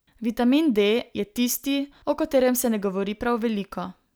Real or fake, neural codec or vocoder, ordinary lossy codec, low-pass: real; none; none; none